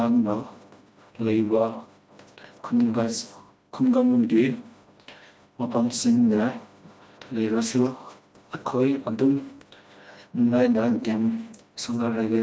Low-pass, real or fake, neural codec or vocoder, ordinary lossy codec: none; fake; codec, 16 kHz, 1 kbps, FreqCodec, smaller model; none